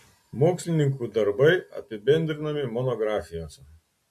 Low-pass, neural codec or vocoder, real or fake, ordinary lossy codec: 14.4 kHz; none; real; MP3, 64 kbps